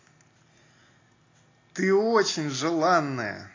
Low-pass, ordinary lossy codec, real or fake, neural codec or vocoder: 7.2 kHz; AAC, 32 kbps; real; none